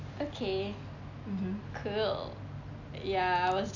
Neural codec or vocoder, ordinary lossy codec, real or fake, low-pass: none; none; real; 7.2 kHz